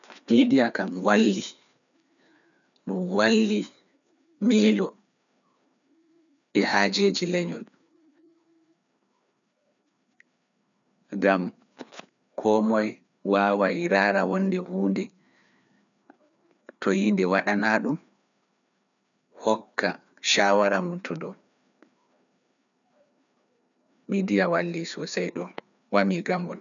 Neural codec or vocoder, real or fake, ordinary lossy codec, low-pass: codec, 16 kHz, 2 kbps, FreqCodec, larger model; fake; none; 7.2 kHz